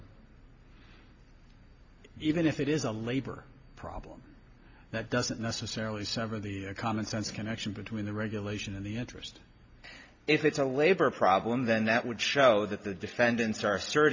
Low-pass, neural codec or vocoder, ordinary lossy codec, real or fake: 7.2 kHz; none; MP3, 48 kbps; real